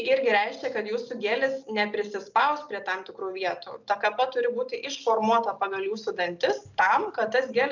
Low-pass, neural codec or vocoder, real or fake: 7.2 kHz; none; real